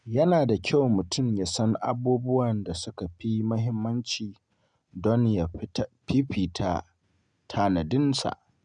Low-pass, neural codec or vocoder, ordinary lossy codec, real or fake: 9.9 kHz; none; none; real